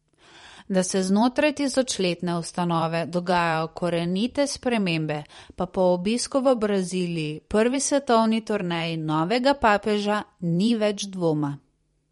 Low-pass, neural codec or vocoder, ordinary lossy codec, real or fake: 19.8 kHz; vocoder, 44.1 kHz, 128 mel bands every 512 samples, BigVGAN v2; MP3, 48 kbps; fake